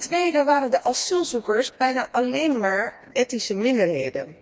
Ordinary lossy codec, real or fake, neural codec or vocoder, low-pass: none; fake; codec, 16 kHz, 2 kbps, FreqCodec, smaller model; none